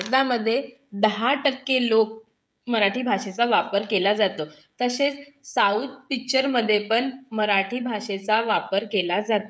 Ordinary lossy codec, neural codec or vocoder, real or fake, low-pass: none; codec, 16 kHz, 16 kbps, FreqCodec, smaller model; fake; none